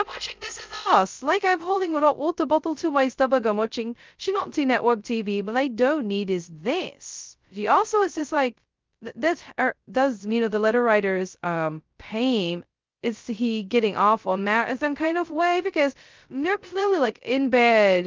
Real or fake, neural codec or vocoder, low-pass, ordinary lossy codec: fake; codec, 16 kHz, 0.2 kbps, FocalCodec; 7.2 kHz; Opus, 32 kbps